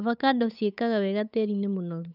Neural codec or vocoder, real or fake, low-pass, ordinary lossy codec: codec, 16 kHz, 8 kbps, FunCodec, trained on Chinese and English, 25 frames a second; fake; 5.4 kHz; none